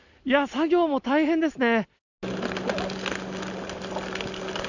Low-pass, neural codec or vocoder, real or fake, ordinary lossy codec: 7.2 kHz; none; real; none